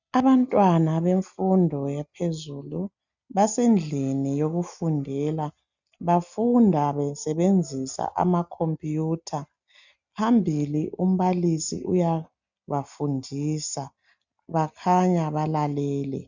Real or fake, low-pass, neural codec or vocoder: real; 7.2 kHz; none